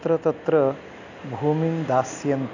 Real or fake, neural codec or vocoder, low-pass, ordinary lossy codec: real; none; 7.2 kHz; none